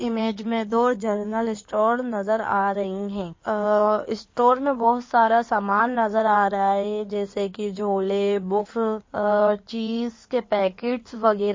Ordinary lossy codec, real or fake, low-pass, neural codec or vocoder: MP3, 32 kbps; fake; 7.2 kHz; codec, 16 kHz in and 24 kHz out, 2.2 kbps, FireRedTTS-2 codec